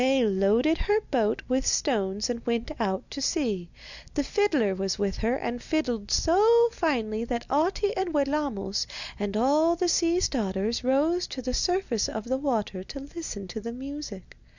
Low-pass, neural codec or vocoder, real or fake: 7.2 kHz; none; real